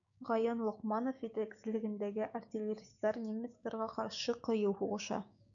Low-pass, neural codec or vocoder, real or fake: 7.2 kHz; codec, 16 kHz, 6 kbps, DAC; fake